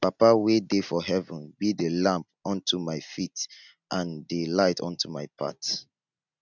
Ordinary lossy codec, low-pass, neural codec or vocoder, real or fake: none; 7.2 kHz; none; real